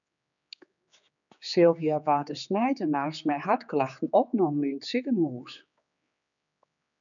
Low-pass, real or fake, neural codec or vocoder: 7.2 kHz; fake; codec, 16 kHz, 4 kbps, X-Codec, HuBERT features, trained on general audio